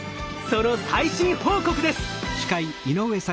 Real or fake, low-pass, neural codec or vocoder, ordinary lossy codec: real; none; none; none